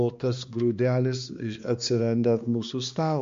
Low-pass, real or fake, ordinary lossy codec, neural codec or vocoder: 7.2 kHz; fake; MP3, 48 kbps; codec, 16 kHz, 2 kbps, X-Codec, HuBERT features, trained on balanced general audio